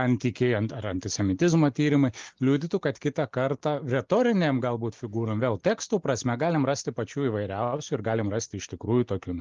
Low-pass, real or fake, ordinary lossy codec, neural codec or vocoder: 7.2 kHz; real; Opus, 32 kbps; none